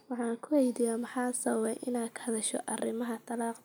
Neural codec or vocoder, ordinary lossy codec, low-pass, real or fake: none; none; none; real